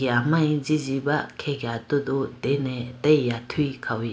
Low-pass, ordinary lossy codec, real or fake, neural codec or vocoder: none; none; real; none